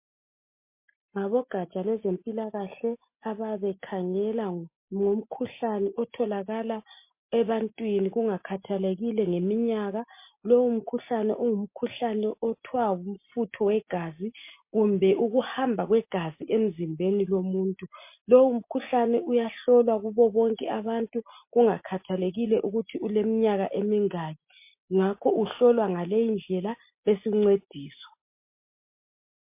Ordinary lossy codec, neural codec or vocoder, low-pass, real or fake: MP3, 24 kbps; none; 3.6 kHz; real